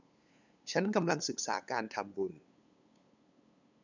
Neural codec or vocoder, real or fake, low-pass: codec, 16 kHz, 8 kbps, FunCodec, trained on LibriTTS, 25 frames a second; fake; 7.2 kHz